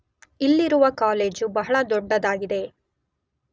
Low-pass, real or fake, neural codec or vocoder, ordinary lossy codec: none; real; none; none